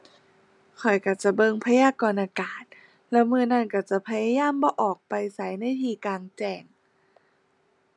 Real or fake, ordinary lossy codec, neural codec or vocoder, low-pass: real; none; none; 10.8 kHz